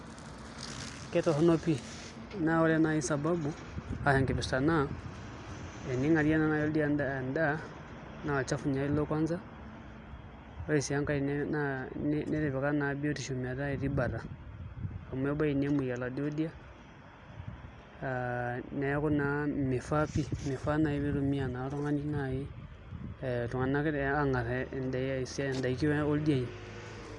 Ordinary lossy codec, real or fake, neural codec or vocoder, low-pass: none; real; none; 10.8 kHz